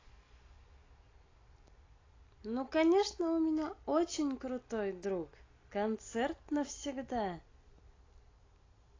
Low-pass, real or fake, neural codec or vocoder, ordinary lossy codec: 7.2 kHz; real; none; AAC, 32 kbps